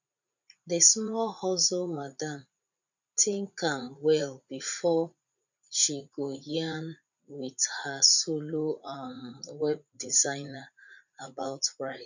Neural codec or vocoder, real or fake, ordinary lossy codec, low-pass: vocoder, 44.1 kHz, 80 mel bands, Vocos; fake; none; 7.2 kHz